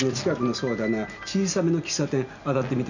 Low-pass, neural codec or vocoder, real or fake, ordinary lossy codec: 7.2 kHz; none; real; none